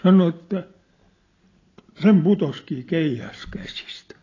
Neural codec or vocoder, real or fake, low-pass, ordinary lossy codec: none; real; 7.2 kHz; AAC, 32 kbps